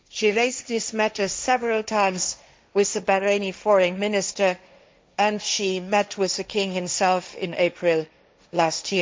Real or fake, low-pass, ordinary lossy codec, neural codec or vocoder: fake; none; none; codec, 16 kHz, 1.1 kbps, Voila-Tokenizer